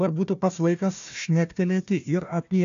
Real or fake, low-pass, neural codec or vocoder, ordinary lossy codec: fake; 7.2 kHz; codec, 16 kHz, 1 kbps, FunCodec, trained on Chinese and English, 50 frames a second; AAC, 64 kbps